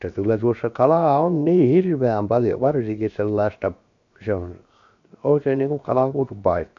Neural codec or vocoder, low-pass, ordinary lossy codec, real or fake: codec, 16 kHz, 0.7 kbps, FocalCodec; 7.2 kHz; AAC, 64 kbps; fake